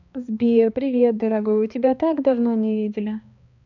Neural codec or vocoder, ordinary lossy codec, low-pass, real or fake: codec, 16 kHz, 2 kbps, X-Codec, HuBERT features, trained on balanced general audio; none; 7.2 kHz; fake